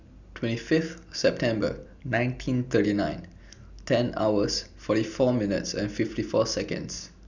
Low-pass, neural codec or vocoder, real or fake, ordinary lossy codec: 7.2 kHz; none; real; none